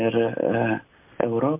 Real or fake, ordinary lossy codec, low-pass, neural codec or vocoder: real; MP3, 32 kbps; 3.6 kHz; none